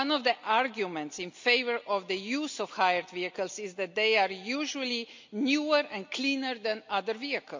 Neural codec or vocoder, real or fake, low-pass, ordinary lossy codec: none; real; 7.2 kHz; MP3, 64 kbps